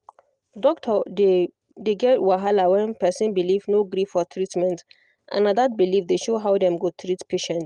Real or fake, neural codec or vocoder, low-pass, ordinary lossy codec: real; none; 10.8 kHz; Opus, 32 kbps